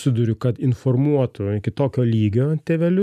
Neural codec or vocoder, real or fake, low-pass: none; real; 14.4 kHz